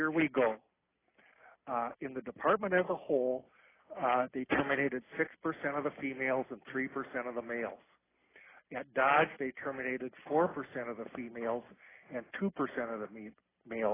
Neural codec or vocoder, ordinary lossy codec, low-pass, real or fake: none; AAC, 16 kbps; 3.6 kHz; real